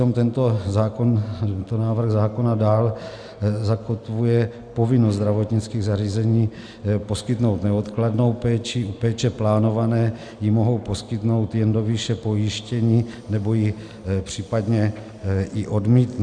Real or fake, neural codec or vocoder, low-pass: real; none; 9.9 kHz